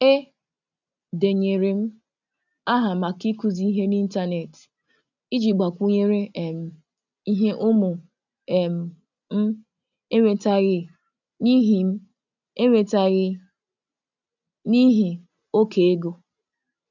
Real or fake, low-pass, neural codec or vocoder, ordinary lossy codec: real; 7.2 kHz; none; none